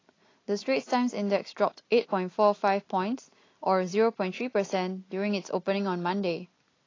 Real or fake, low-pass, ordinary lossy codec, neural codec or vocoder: real; 7.2 kHz; AAC, 32 kbps; none